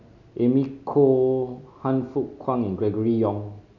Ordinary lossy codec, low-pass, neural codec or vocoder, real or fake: none; 7.2 kHz; none; real